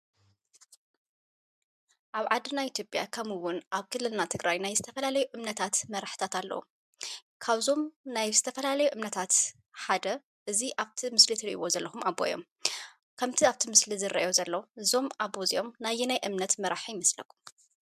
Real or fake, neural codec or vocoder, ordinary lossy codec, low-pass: real; none; MP3, 96 kbps; 14.4 kHz